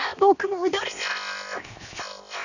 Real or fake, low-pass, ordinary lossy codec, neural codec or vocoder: fake; 7.2 kHz; none; codec, 16 kHz, 0.7 kbps, FocalCodec